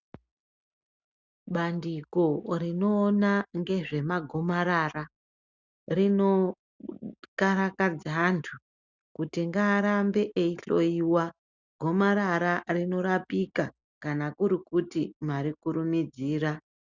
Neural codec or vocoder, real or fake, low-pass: none; real; 7.2 kHz